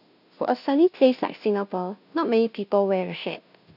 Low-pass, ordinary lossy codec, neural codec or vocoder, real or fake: 5.4 kHz; none; codec, 16 kHz, 0.5 kbps, FunCodec, trained on Chinese and English, 25 frames a second; fake